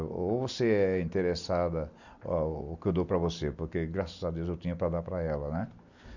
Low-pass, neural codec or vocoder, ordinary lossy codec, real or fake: 7.2 kHz; none; none; real